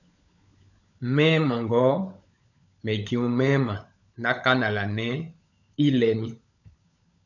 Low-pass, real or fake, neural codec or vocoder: 7.2 kHz; fake; codec, 16 kHz, 16 kbps, FunCodec, trained on LibriTTS, 50 frames a second